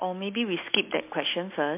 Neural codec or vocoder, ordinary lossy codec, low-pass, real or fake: none; MP3, 24 kbps; 3.6 kHz; real